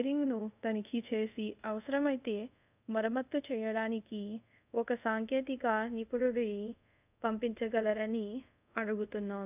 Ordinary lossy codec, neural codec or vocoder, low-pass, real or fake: none; codec, 24 kHz, 0.5 kbps, DualCodec; 3.6 kHz; fake